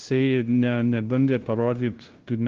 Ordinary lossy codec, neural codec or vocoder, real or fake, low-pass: Opus, 16 kbps; codec, 16 kHz, 0.5 kbps, FunCodec, trained on LibriTTS, 25 frames a second; fake; 7.2 kHz